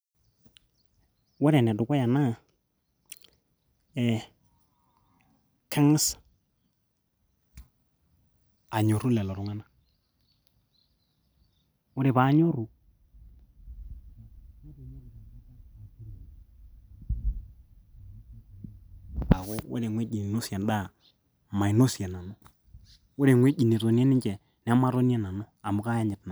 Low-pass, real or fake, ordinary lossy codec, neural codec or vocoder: none; real; none; none